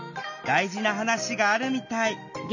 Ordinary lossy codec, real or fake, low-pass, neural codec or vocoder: none; real; 7.2 kHz; none